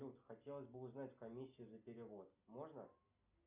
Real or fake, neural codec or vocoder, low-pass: real; none; 3.6 kHz